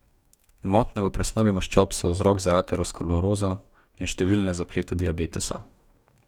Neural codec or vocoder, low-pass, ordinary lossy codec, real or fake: codec, 44.1 kHz, 2.6 kbps, DAC; 19.8 kHz; none; fake